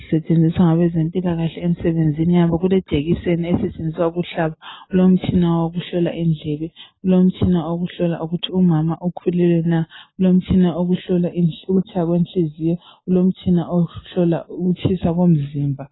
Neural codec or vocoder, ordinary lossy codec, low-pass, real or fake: none; AAC, 16 kbps; 7.2 kHz; real